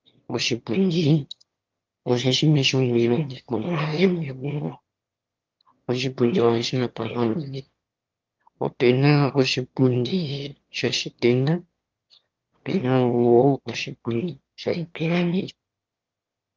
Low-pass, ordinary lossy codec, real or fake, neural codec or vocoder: 7.2 kHz; Opus, 32 kbps; fake; autoencoder, 22.05 kHz, a latent of 192 numbers a frame, VITS, trained on one speaker